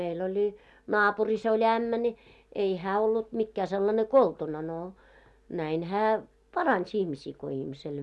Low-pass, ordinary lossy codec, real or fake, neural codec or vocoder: none; none; real; none